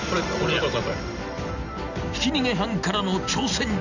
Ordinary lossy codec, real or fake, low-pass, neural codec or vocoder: none; real; 7.2 kHz; none